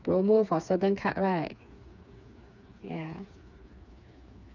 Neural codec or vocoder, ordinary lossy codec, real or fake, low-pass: codec, 16 kHz, 4 kbps, FreqCodec, smaller model; none; fake; 7.2 kHz